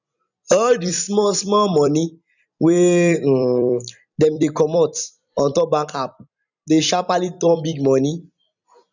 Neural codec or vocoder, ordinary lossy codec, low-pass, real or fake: vocoder, 44.1 kHz, 128 mel bands every 256 samples, BigVGAN v2; none; 7.2 kHz; fake